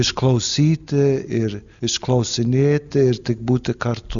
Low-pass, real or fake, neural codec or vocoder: 7.2 kHz; real; none